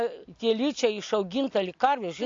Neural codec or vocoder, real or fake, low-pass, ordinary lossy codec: none; real; 7.2 kHz; AAC, 48 kbps